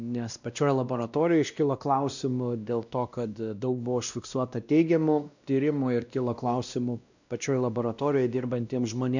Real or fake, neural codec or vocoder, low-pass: fake; codec, 16 kHz, 1 kbps, X-Codec, WavLM features, trained on Multilingual LibriSpeech; 7.2 kHz